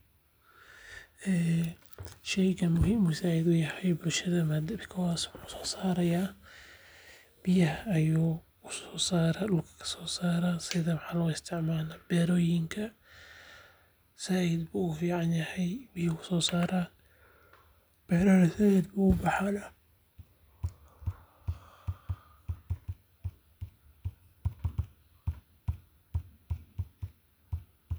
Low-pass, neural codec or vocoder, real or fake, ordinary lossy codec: none; none; real; none